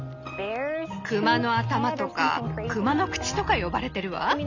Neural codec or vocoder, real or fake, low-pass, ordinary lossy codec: none; real; 7.2 kHz; none